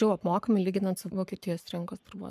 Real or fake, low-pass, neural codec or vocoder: fake; 14.4 kHz; codec, 44.1 kHz, 7.8 kbps, Pupu-Codec